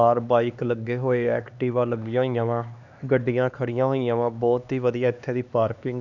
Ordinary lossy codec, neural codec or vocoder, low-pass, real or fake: none; codec, 16 kHz, 2 kbps, X-Codec, HuBERT features, trained on LibriSpeech; 7.2 kHz; fake